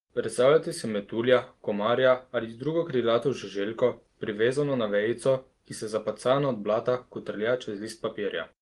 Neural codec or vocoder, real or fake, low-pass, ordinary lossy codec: none; real; 10.8 kHz; Opus, 24 kbps